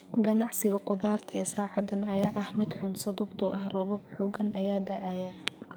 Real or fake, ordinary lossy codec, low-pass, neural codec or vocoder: fake; none; none; codec, 44.1 kHz, 2.6 kbps, SNAC